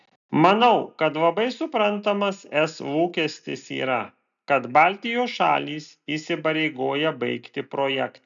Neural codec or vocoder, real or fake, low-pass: none; real; 7.2 kHz